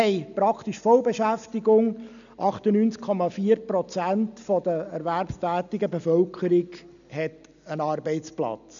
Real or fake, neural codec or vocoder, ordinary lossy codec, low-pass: real; none; none; 7.2 kHz